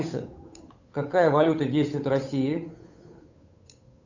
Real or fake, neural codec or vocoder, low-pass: fake; codec, 16 kHz, 8 kbps, FunCodec, trained on Chinese and English, 25 frames a second; 7.2 kHz